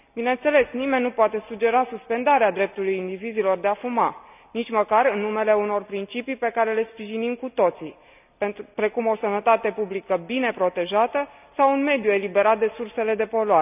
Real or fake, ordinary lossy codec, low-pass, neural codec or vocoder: real; none; 3.6 kHz; none